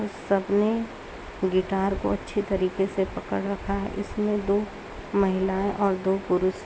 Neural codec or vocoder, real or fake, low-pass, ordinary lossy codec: none; real; none; none